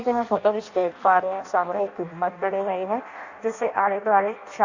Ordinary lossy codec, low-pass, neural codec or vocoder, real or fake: Opus, 64 kbps; 7.2 kHz; codec, 16 kHz in and 24 kHz out, 0.6 kbps, FireRedTTS-2 codec; fake